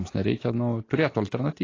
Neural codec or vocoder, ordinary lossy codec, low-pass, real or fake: none; AAC, 32 kbps; 7.2 kHz; real